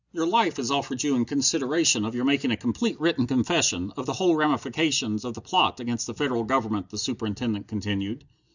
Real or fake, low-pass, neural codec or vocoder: real; 7.2 kHz; none